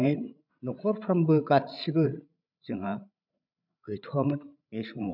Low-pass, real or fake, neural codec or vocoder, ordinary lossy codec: 5.4 kHz; fake; codec, 16 kHz, 16 kbps, FreqCodec, larger model; none